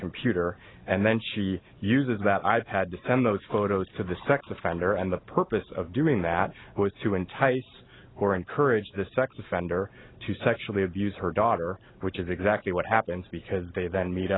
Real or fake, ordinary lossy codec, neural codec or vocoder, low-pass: real; AAC, 16 kbps; none; 7.2 kHz